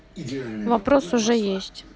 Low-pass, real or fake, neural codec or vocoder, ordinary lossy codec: none; real; none; none